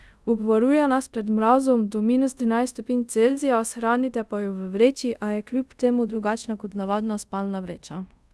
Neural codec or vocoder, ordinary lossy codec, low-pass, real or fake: codec, 24 kHz, 0.5 kbps, DualCodec; none; none; fake